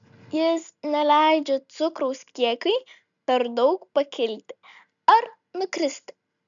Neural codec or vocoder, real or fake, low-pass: none; real; 7.2 kHz